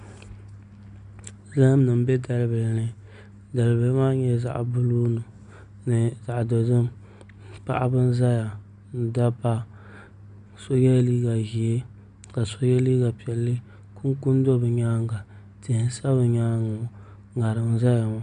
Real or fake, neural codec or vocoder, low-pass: real; none; 9.9 kHz